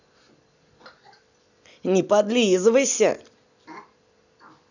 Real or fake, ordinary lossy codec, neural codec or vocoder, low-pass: real; none; none; 7.2 kHz